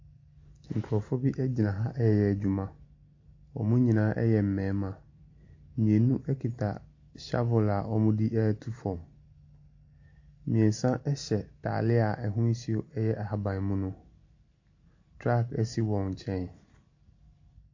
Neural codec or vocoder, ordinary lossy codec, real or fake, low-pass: none; AAC, 48 kbps; real; 7.2 kHz